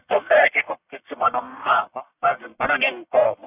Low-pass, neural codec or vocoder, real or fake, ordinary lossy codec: 3.6 kHz; codec, 44.1 kHz, 1.7 kbps, Pupu-Codec; fake; none